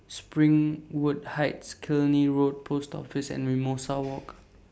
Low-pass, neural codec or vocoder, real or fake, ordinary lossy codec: none; none; real; none